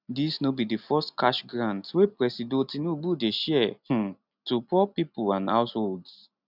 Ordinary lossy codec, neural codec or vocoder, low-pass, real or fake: none; none; 5.4 kHz; real